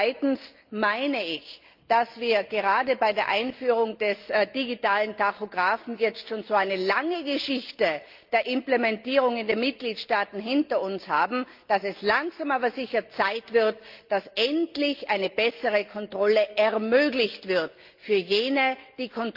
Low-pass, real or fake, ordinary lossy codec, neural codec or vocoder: 5.4 kHz; real; Opus, 24 kbps; none